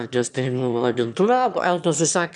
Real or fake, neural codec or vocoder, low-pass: fake; autoencoder, 22.05 kHz, a latent of 192 numbers a frame, VITS, trained on one speaker; 9.9 kHz